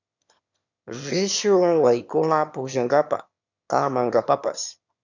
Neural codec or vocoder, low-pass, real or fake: autoencoder, 22.05 kHz, a latent of 192 numbers a frame, VITS, trained on one speaker; 7.2 kHz; fake